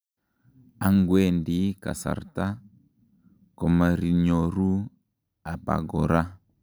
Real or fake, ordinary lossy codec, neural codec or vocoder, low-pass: real; none; none; none